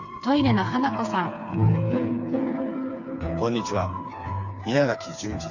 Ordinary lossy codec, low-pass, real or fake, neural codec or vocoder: none; 7.2 kHz; fake; codec, 16 kHz, 4 kbps, FreqCodec, smaller model